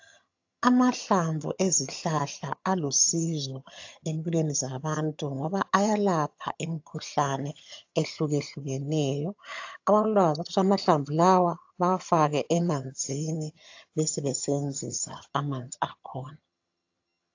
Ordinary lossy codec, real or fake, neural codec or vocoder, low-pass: AAC, 48 kbps; fake; vocoder, 22.05 kHz, 80 mel bands, HiFi-GAN; 7.2 kHz